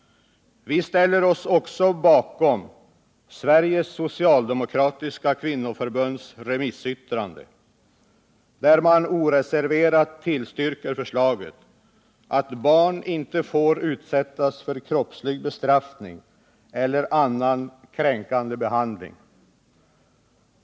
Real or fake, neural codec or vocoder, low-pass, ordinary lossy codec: real; none; none; none